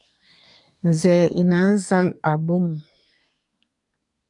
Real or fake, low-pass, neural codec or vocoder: fake; 10.8 kHz; codec, 24 kHz, 1 kbps, SNAC